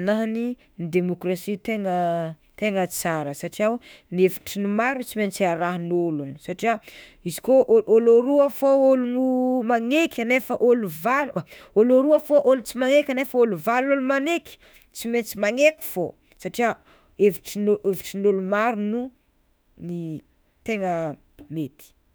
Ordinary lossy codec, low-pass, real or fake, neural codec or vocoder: none; none; fake; autoencoder, 48 kHz, 32 numbers a frame, DAC-VAE, trained on Japanese speech